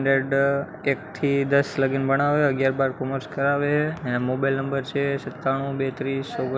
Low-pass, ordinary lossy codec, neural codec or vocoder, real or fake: none; none; none; real